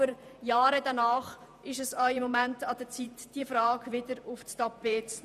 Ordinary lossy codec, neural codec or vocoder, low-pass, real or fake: none; vocoder, 48 kHz, 128 mel bands, Vocos; 14.4 kHz; fake